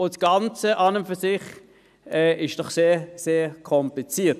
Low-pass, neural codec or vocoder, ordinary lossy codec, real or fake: 14.4 kHz; none; none; real